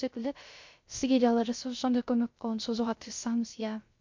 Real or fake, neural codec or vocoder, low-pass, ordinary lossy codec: fake; codec, 16 kHz, 0.3 kbps, FocalCodec; 7.2 kHz; MP3, 48 kbps